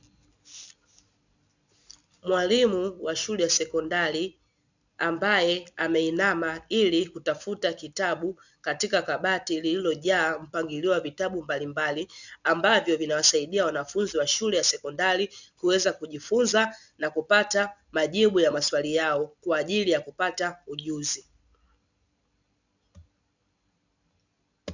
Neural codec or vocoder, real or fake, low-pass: none; real; 7.2 kHz